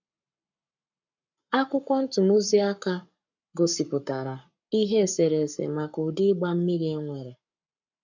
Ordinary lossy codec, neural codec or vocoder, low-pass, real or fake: none; codec, 44.1 kHz, 7.8 kbps, Pupu-Codec; 7.2 kHz; fake